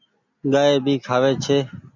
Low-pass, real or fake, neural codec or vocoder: 7.2 kHz; real; none